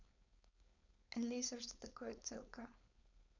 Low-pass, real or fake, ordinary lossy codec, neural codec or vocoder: 7.2 kHz; fake; none; codec, 16 kHz, 4.8 kbps, FACodec